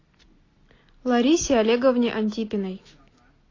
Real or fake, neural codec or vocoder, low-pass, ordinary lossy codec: real; none; 7.2 kHz; AAC, 32 kbps